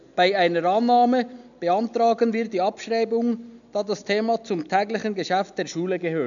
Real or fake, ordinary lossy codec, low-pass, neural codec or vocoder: real; none; 7.2 kHz; none